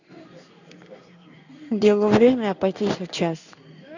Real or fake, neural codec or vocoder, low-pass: fake; codec, 16 kHz in and 24 kHz out, 1 kbps, XY-Tokenizer; 7.2 kHz